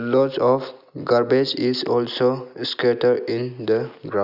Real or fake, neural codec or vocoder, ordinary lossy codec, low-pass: real; none; none; 5.4 kHz